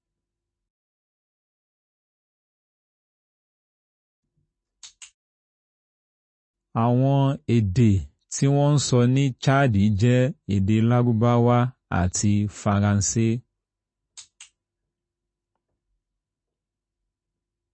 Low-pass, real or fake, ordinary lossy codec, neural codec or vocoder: 9.9 kHz; real; MP3, 32 kbps; none